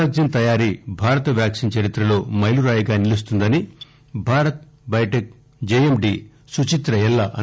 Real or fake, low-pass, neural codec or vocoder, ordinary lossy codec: real; none; none; none